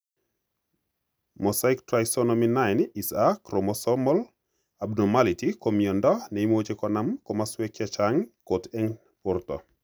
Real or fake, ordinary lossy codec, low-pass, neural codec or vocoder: real; none; none; none